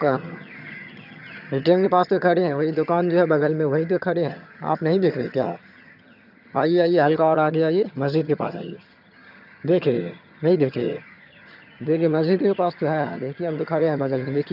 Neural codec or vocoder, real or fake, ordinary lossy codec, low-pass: vocoder, 22.05 kHz, 80 mel bands, HiFi-GAN; fake; none; 5.4 kHz